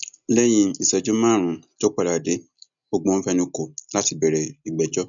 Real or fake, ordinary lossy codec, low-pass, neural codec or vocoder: real; none; 7.2 kHz; none